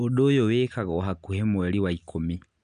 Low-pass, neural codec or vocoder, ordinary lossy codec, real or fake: 9.9 kHz; none; none; real